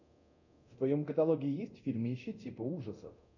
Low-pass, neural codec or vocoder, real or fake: 7.2 kHz; codec, 24 kHz, 0.9 kbps, DualCodec; fake